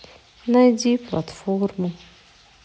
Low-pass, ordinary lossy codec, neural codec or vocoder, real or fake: none; none; none; real